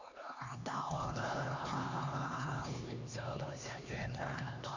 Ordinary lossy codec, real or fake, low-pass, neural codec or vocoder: none; fake; 7.2 kHz; codec, 16 kHz, 2 kbps, X-Codec, HuBERT features, trained on LibriSpeech